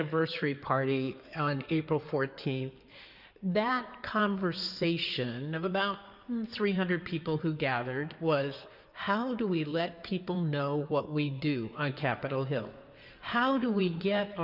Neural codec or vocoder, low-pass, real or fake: codec, 16 kHz in and 24 kHz out, 2.2 kbps, FireRedTTS-2 codec; 5.4 kHz; fake